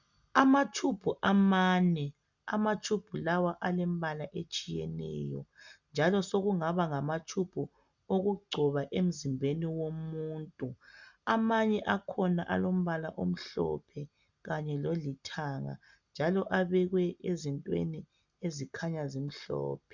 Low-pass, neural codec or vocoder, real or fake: 7.2 kHz; none; real